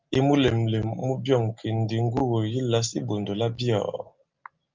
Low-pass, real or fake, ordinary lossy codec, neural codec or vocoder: 7.2 kHz; real; Opus, 24 kbps; none